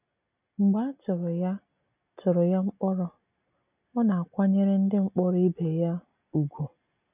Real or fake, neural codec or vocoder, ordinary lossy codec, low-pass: real; none; none; 3.6 kHz